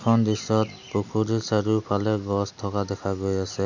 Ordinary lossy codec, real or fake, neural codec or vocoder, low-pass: none; real; none; 7.2 kHz